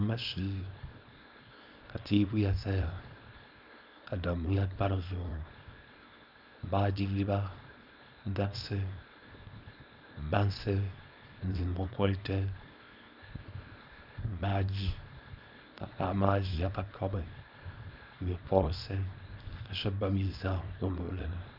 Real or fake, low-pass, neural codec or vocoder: fake; 5.4 kHz; codec, 24 kHz, 0.9 kbps, WavTokenizer, small release